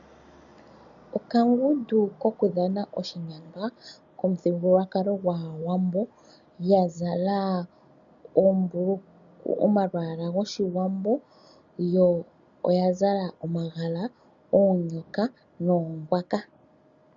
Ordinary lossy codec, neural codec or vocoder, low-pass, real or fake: MP3, 96 kbps; none; 7.2 kHz; real